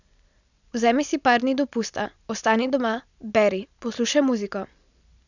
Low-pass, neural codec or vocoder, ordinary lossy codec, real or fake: 7.2 kHz; none; none; real